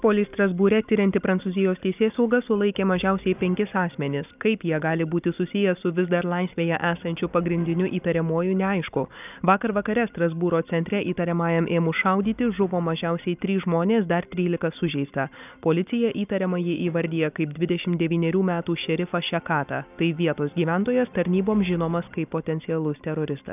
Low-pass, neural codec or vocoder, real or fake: 3.6 kHz; none; real